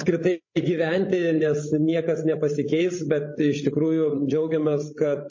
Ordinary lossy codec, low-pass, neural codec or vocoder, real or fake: MP3, 32 kbps; 7.2 kHz; codec, 16 kHz, 16 kbps, FreqCodec, larger model; fake